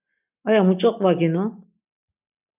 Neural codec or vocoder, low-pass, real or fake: none; 3.6 kHz; real